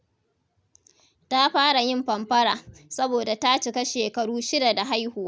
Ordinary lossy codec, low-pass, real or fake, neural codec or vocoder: none; none; real; none